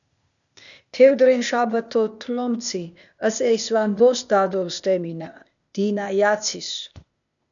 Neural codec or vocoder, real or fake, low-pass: codec, 16 kHz, 0.8 kbps, ZipCodec; fake; 7.2 kHz